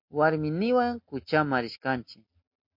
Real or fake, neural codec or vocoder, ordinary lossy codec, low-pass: real; none; MP3, 32 kbps; 5.4 kHz